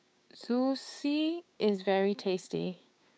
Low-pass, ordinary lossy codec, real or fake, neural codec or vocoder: none; none; fake; codec, 16 kHz, 6 kbps, DAC